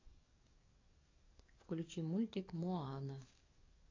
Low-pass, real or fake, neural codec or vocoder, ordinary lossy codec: 7.2 kHz; fake; codec, 44.1 kHz, 7.8 kbps, DAC; AAC, 32 kbps